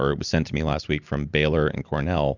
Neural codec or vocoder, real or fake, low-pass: none; real; 7.2 kHz